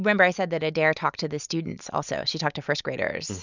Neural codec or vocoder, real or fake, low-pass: none; real; 7.2 kHz